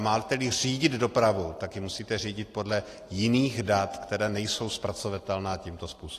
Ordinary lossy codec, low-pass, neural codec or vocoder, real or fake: AAC, 48 kbps; 14.4 kHz; none; real